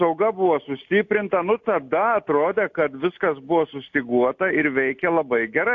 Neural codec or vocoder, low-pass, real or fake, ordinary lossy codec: none; 7.2 kHz; real; AAC, 48 kbps